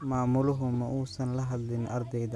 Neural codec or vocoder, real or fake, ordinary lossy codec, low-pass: none; real; none; none